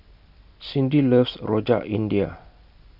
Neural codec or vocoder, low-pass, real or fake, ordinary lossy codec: none; 5.4 kHz; real; none